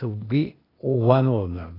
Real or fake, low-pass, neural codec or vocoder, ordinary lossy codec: fake; 5.4 kHz; codec, 16 kHz, 0.8 kbps, ZipCodec; AAC, 24 kbps